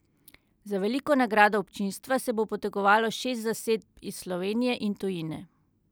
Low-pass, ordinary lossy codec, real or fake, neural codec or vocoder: none; none; real; none